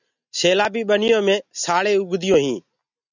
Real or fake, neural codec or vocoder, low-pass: real; none; 7.2 kHz